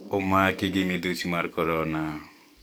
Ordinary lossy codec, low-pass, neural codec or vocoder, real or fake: none; none; codec, 44.1 kHz, 7.8 kbps, DAC; fake